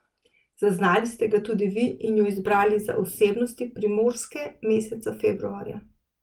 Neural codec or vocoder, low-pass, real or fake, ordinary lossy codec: none; 19.8 kHz; real; Opus, 24 kbps